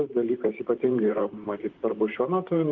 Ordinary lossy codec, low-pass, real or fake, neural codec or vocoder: Opus, 24 kbps; 7.2 kHz; fake; vocoder, 44.1 kHz, 128 mel bands, Pupu-Vocoder